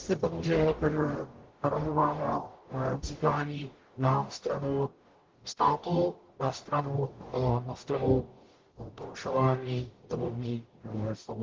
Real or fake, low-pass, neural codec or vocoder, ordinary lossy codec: fake; 7.2 kHz; codec, 44.1 kHz, 0.9 kbps, DAC; Opus, 16 kbps